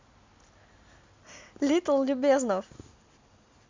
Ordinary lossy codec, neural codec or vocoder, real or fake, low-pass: MP3, 64 kbps; none; real; 7.2 kHz